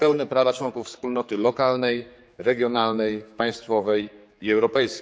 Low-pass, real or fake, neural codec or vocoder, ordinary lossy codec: none; fake; codec, 16 kHz, 4 kbps, X-Codec, HuBERT features, trained on general audio; none